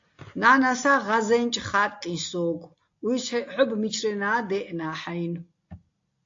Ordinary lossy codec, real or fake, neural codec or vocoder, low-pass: AAC, 48 kbps; real; none; 7.2 kHz